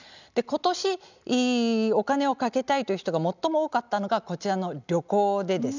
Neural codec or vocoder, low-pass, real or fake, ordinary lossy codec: none; 7.2 kHz; real; none